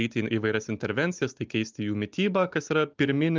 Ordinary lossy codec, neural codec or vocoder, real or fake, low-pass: Opus, 24 kbps; none; real; 7.2 kHz